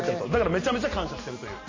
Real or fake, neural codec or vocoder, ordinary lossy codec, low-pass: real; none; MP3, 32 kbps; 7.2 kHz